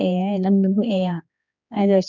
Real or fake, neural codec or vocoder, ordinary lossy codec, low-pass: fake; codec, 16 kHz, 2 kbps, X-Codec, HuBERT features, trained on general audio; none; 7.2 kHz